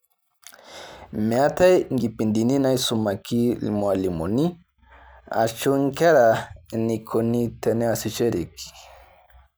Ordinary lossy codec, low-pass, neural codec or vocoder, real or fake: none; none; none; real